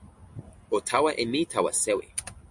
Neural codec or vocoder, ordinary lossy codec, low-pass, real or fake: none; MP3, 64 kbps; 10.8 kHz; real